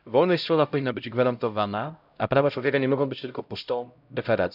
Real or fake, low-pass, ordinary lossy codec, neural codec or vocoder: fake; 5.4 kHz; none; codec, 16 kHz, 0.5 kbps, X-Codec, HuBERT features, trained on LibriSpeech